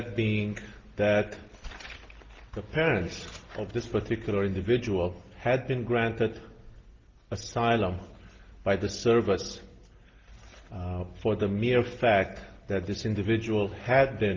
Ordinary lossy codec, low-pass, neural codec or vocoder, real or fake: Opus, 16 kbps; 7.2 kHz; none; real